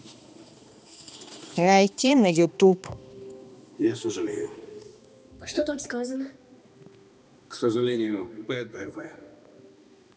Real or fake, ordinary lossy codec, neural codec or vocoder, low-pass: fake; none; codec, 16 kHz, 2 kbps, X-Codec, HuBERT features, trained on balanced general audio; none